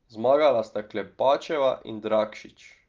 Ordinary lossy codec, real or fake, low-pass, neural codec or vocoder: Opus, 32 kbps; real; 7.2 kHz; none